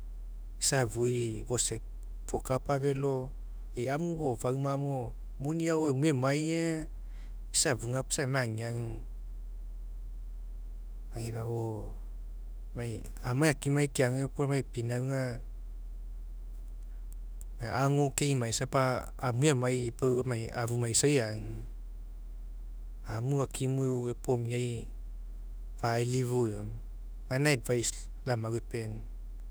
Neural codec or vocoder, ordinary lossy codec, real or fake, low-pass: autoencoder, 48 kHz, 32 numbers a frame, DAC-VAE, trained on Japanese speech; none; fake; none